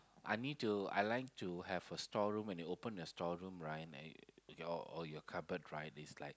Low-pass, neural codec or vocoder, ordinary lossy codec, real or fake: none; none; none; real